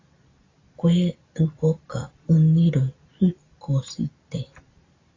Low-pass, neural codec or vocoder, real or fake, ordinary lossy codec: 7.2 kHz; none; real; AAC, 32 kbps